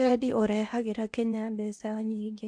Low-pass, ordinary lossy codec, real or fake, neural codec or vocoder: 9.9 kHz; AAC, 64 kbps; fake; codec, 16 kHz in and 24 kHz out, 0.8 kbps, FocalCodec, streaming, 65536 codes